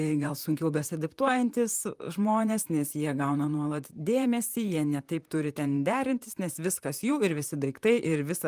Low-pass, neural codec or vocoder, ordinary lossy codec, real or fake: 14.4 kHz; vocoder, 44.1 kHz, 128 mel bands, Pupu-Vocoder; Opus, 32 kbps; fake